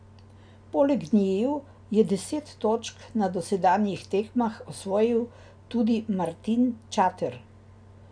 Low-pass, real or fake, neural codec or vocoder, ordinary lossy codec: 9.9 kHz; real; none; none